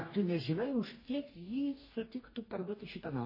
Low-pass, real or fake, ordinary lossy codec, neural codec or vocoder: 5.4 kHz; fake; MP3, 24 kbps; codec, 44.1 kHz, 2.6 kbps, DAC